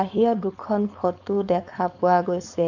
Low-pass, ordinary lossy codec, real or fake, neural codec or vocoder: 7.2 kHz; none; fake; codec, 16 kHz, 4.8 kbps, FACodec